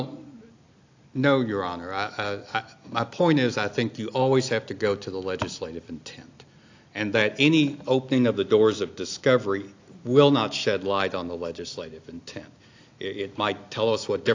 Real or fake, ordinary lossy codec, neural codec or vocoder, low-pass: real; AAC, 48 kbps; none; 7.2 kHz